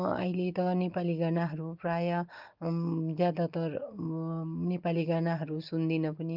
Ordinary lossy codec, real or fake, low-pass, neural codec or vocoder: Opus, 32 kbps; real; 5.4 kHz; none